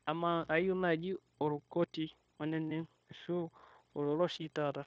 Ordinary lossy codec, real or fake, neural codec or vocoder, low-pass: none; fake; codec, 16 kHz, 0.9 kbps, LongCat-Audio-Codec; none